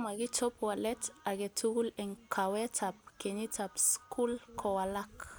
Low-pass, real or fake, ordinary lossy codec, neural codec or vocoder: none; real; none; none